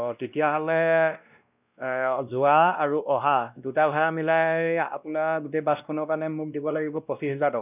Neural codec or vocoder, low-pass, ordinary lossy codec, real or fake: codec, 16 kHz, 1 kbps, X-Codec, WavLM features, trained on Multilingual LibriSpeech; 3.6 kHz; none; fake